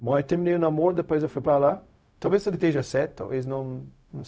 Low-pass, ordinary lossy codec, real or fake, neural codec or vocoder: none; none; fake; codec, 16 kHz, 0.4 kbps, LongCat-Audio-Codec